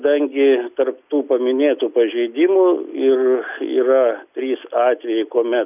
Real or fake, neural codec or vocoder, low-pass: real; none; 3.6 kHz